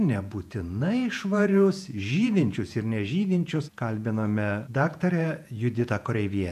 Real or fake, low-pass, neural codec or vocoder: fake; 14.4 kHz; vocoder, 48 kHz, 128 mel bands, Vocos